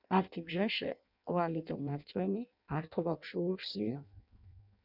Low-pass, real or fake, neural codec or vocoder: 5.4 kHz; fake; codec, 16 kHz in and 24 kHz out, 0.6 kbps, FireRedTTS-2 codec